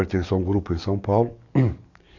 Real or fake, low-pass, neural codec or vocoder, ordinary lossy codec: fake; 7.2 kHz; codec, 44.1 kHz, 7.8 kbps, DAC; none